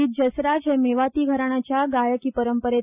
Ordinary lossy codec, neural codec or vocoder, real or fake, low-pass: none; none; real; 3.6 kHz